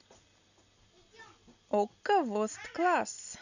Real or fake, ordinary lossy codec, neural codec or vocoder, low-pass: real; none; none; 7.2 kHz